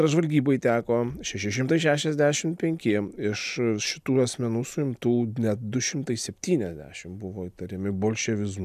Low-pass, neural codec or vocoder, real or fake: 14.4 kHz; none; real